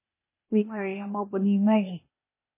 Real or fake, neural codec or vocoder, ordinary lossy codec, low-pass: fake; codec, 16 kHz, 0.8 kbps, ZipCodec; MP3, 16 kbps; 3.6 kHz